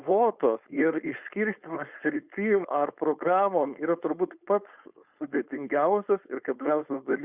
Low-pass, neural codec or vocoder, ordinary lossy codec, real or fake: 3.6 kHz; codec, 16 kHz, 4.8 kbps, FACodec; Opus, 64 kbps; fake